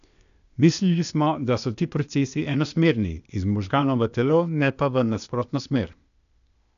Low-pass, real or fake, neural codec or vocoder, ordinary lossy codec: 7.2 kHz; fake; codec, 16 kHz, 0.8 kbps, ZipCodec; none